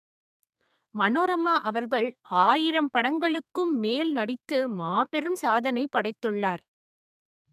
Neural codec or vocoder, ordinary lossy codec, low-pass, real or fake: codec, 32 kHz, 1.9 kbps, SNAC; none; 14.4 kHz; fake